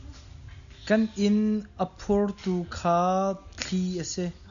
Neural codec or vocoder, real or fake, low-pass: none; real; 7.2 kHz